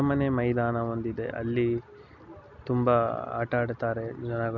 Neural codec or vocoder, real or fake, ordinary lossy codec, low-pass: none; real; none; 7.2 kHz